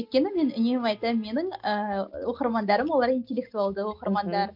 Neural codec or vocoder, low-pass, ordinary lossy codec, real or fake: none; 5.4 kHz; MP3, 48 kbps; real